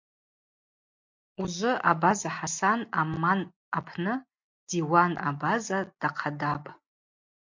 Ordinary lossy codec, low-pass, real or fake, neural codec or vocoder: MP3, 48 kbps; 7.2 kHz; real; none